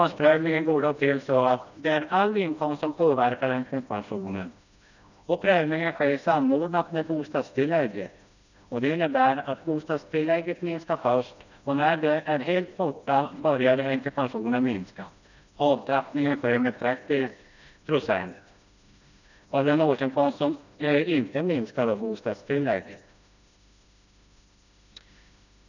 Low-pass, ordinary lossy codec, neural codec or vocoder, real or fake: 7.2 kHz; none; codec, 16 kHz, 1 kbps, FreqCodec, smaller model; fake